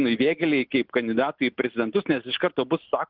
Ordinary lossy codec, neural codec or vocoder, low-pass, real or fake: Opus, 32 kbps; none; 5.4 kHz; real